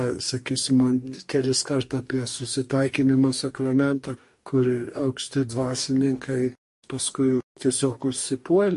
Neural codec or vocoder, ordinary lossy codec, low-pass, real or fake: codec, 44.1 kHz, 2.6 kbps, DAC; MP3, 48 kbps; 14.4 kHz; fake